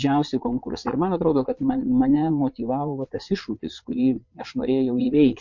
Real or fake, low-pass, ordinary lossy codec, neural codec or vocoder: fake; 7.2 kHz; MP3, 48 kbps; vocoder, 22.05 kHz, 80 mel bands, Vocos